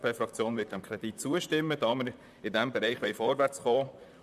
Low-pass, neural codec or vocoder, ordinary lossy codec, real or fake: 14.4 kHz; vocoder, 44.1 kHz, 128 mel bands, Pupu-Vocoder; none; fake